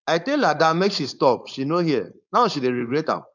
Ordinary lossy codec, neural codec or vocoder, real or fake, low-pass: none; codec, 16 kHz, 4.8 kbps, FACodec; fake; 7.2 kHz